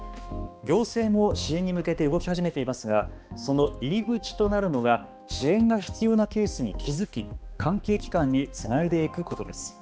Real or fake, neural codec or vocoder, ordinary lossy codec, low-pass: fake; codec, 16 kHz, 2 kbps, X-Codec, HuBERT features, trained on balanced general audio; none; none